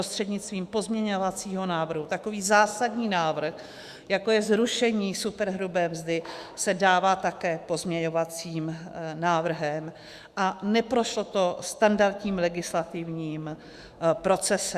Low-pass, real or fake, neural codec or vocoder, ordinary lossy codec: 14.4 kHz; fake; autoencoder, 48 kHz, 128 numbers a frame, DAC-VAE, trained on Japanese speech; Opus, 64 kbps